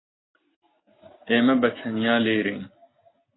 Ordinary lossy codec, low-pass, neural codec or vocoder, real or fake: AAC, 16 kbps; 7.2 kHz; none; real